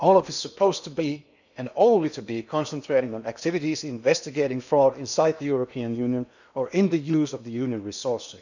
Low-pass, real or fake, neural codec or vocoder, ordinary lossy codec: 7.2 kHz; fake; codec, 16 kHz in and 24 kHz out, 0.8 kbps, FocalCodec, streaming, 65536 codes; none